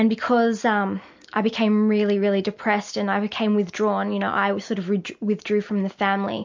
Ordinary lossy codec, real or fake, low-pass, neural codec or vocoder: MP3, 64 kbps; real; 7.2 kHz; none